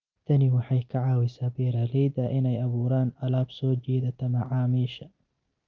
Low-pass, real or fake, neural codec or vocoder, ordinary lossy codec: 7.2 kHz; real; none; Opus, 24 kbps